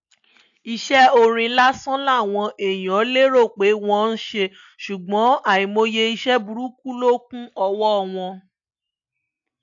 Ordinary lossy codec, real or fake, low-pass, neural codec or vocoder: AAC, 64 kbps; real; 7.2 kHz; none